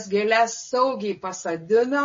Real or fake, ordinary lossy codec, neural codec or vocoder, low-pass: real; MP3, 32 kbps; none; 7.2 kHz